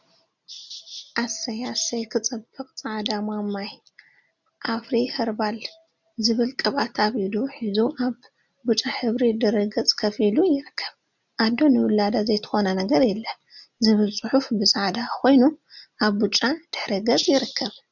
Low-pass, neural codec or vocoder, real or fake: 7.2 kHz; none; real